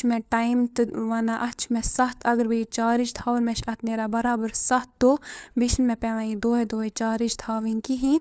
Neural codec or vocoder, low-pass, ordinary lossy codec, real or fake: codec, 16 kHz, 4 kbps, FunCodec, trained on LibriTTS, 50 frames a second; none; none; fake